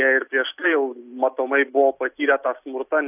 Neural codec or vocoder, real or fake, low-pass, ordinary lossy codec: none; real; 3.6 kHz; AAC, 32 kbps